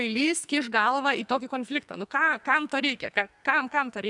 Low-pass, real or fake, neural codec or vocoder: 10.8 kHz; fake; codec, 44.1 kHz, 2.6 kbps, SNAC